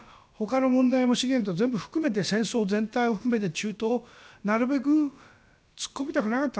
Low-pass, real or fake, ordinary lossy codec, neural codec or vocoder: none; fake; none; codec, 16 kHz, about 1 kbps, DyCAST, with the encoder's durations